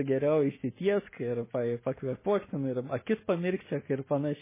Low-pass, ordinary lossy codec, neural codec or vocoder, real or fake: 3.6 kHz; MP3, 16 kbps; none; real